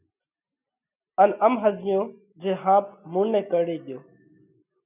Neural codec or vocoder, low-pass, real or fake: none; 3.6 kHz; real